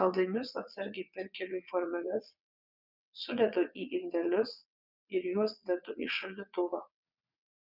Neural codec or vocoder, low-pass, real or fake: vocoder, 22.05 kHz, 80 mel bands, WaveNeXt; 5.4 kHz; fake